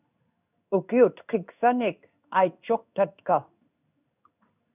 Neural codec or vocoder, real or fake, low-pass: codec, 16 kHz in and 24 kHz out, 1 kbps, XY-Tokenizer; fake; 3.6 kHz